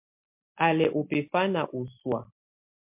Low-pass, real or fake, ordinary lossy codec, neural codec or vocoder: 3.6 kHz; real; MP3, 32 kbps; none